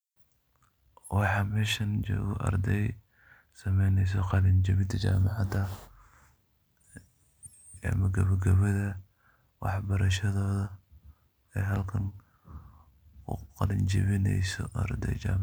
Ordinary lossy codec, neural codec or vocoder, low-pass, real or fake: none; none; none; real